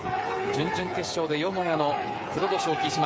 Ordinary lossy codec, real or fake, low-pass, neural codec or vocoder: none; fake; none; codec, 16 kHz, 8 kbps, FreqCodec, smaller model